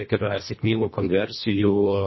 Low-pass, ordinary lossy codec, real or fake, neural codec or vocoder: 7.2 kHz; MP3, 24 kbps; fake; codec, 24 kHz, 1.5 kbps, HILCodec